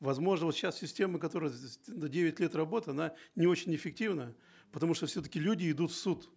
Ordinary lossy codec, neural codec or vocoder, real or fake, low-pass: none; none; real; none